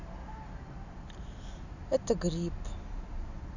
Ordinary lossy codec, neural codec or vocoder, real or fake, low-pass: none; none; real; 7.2 kHz